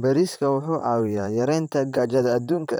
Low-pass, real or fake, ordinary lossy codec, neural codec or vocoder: none; fake; none; vocoder, 44.1 kHz, 128 mel bands, Pupu-Vocoder